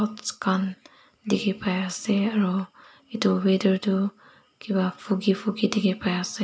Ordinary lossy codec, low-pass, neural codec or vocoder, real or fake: none; none; none; real